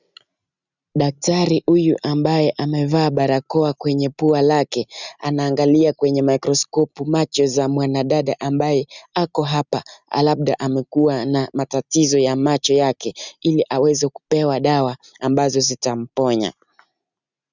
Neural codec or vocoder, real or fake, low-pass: none; real; 7.2 kHz